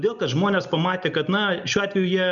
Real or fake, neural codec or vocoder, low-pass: real; none; 7.2 kHz